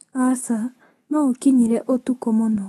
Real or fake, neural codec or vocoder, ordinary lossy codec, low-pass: fake; autoencoder, 48 kHz, 128 numbers a frame, DAC-VAE, trained on Japanese speech; AAC, 32 kbps; 19.8 kHz